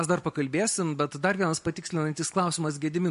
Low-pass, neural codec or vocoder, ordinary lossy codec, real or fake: 10.8 kHz; none; MP3, 48 kbps; real